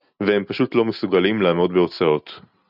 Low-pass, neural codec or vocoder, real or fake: 5.4 kHz; none; real